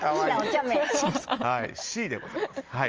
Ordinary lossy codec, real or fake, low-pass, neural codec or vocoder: Opus, 24 kbps; real; 7.2 kHz; none